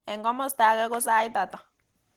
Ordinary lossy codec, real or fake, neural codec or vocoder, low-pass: Opus, 16 kbps; real; none; 19.8 kHz